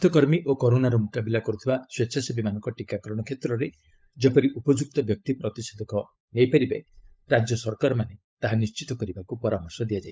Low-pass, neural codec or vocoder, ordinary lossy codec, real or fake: none; codec, 16 kHz, 16 kbps, FunCodec, trained on LibriTTS, 50 frames a second; none; fake